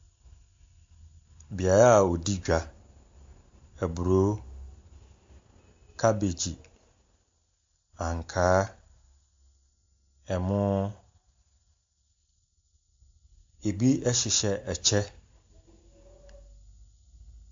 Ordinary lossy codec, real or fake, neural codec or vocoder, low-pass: MP3, 48 kbps; real; none; 7.2 kHz